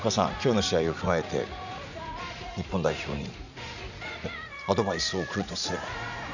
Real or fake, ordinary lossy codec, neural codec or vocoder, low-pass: fake; none; vocoder, 44.1 kHz, 80 mel bands, Vocos; 7.2 kHz